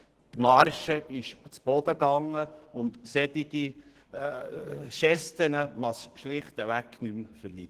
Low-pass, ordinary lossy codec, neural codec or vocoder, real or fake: 14.4 kHz; Opus, 16 kbps; codec, 32 kHz, 1.9 kbps, SNAC; fake